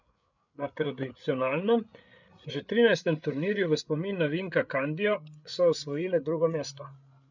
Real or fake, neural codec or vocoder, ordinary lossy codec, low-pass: fake; codec, 16 kHz, 8 kbps, FreqCodec, larger model; none; 7.2 kHz